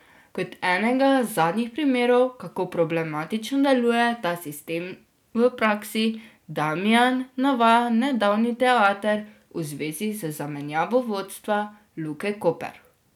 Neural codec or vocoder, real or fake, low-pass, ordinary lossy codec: none; real; 19.8 kHz; none